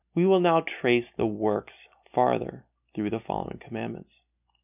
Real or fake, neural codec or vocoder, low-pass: real; none; 3.6 kHz